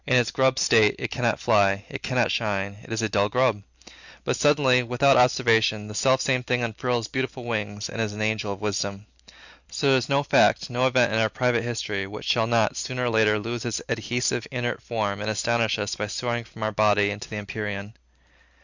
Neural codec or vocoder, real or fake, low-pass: none; real; 7.2 kHz